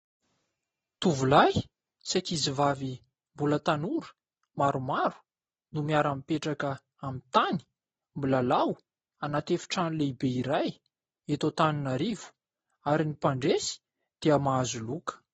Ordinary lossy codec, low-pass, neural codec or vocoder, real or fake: AAC, 24 kbps; 10.8 kHz; none; real